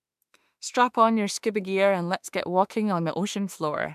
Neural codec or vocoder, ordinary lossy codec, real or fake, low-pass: autoencoder, 48 kHz, 32 numbers a frame, DAC-VAE, trained on Japanese speech; MP3, 96 kbps; fake; 14.4 kHz